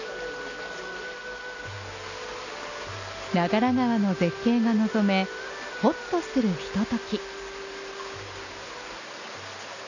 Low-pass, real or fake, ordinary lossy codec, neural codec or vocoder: 7.2 kHz; real; none; none